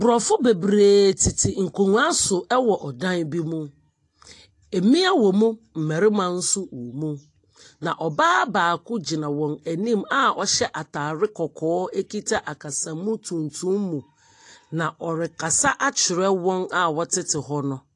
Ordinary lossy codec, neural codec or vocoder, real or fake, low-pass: AAC, 48 kbps; none; real; 10.8 kHz